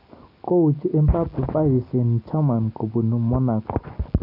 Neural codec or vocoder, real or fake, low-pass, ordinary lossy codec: none; real; 5.4 kHz; none